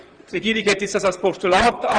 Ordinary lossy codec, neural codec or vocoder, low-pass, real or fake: Opus, 24 kbps; vocoder, 44.1 kHz, 128 mel bands, Pupu-Vocoder; 9.9 kHz; fake